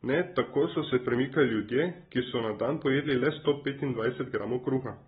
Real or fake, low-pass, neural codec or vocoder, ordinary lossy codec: real; 7.2 kHz; none; AAC, 16 kbps